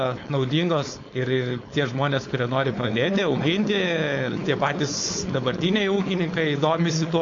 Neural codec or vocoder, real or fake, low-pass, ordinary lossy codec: codec, 16 kHz, 4.8 kbps, FACodec; fake; 7.2 kHz; AAC, 48 kbps